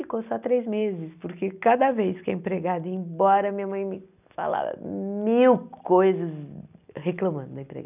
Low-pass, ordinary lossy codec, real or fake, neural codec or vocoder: 3.6 kHz; none; real; none